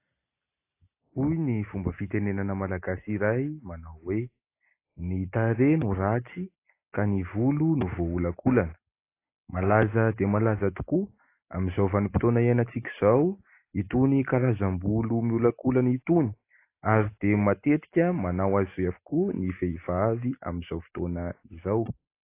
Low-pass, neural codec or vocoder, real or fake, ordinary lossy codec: 3.6 kHz; none; real; AAC, 24 kbps